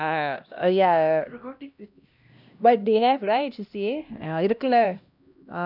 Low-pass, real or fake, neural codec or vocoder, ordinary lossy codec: 5.4 kHz; fake; codec, 16 kHz, 1 kbps, X-Codec, HuBERT features, trained on LibriSpeech; none